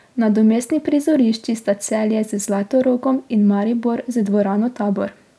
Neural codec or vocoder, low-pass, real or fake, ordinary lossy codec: none; none; real; none